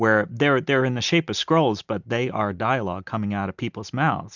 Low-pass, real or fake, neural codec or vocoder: 7.2 kHz; real; none